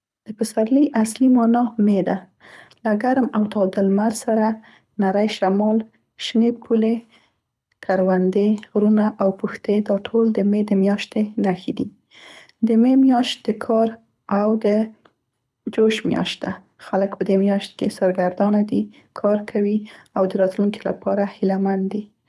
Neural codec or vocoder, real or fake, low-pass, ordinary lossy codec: codec, 24 kHz, 6 kbps, HILCodec; fake; none; none